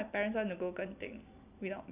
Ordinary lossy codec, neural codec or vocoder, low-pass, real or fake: none; none; 3.6 kHz; real